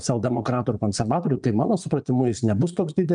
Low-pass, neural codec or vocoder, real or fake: 9.9 kHz; vocoder, 22.05 kHz, 80 mel bands, Vocos; fake